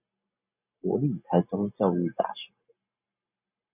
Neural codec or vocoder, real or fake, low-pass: none; real; 3.6 kHz